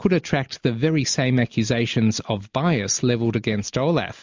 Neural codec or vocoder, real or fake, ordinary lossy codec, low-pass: none; real; MP3, 64 kbps; 7.2 kHz